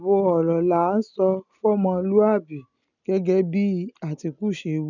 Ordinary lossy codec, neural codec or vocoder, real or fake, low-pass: none; none; real; 7.2 kHz